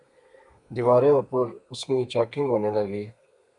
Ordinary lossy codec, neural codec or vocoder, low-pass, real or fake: MP3, 96 kbps; codec, 32 kHz, 1.9 kbps, SNAC; 10.8 kHz; fake